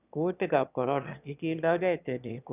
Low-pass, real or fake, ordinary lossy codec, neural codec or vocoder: 3.6 kHz; fake; none; autoencoder, 22.05 kHz, a latent of 192 numbers a frame, VITS, trained on one speaker